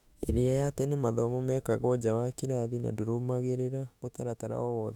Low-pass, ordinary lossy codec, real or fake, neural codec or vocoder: 19.8 kHz; none; fake; autoencoder, 48 kHz, 32 numbers a frame, DAC-VAE, trained on Japanese speech